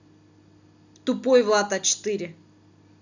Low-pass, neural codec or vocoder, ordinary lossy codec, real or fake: 7.2 kHz; none; none; real